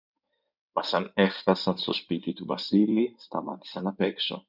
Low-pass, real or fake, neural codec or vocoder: 5.4 kHz; fake; codec, 16 kHz in and 24 kHz out, 2.2 kbps, FireRedTTS-2 codec